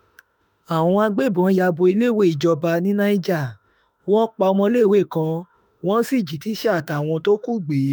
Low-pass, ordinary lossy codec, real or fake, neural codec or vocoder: none; none; fake; autoencoder, 48 kHz, 32 numbers a frame, DAC-VAE, trained on Japanese speech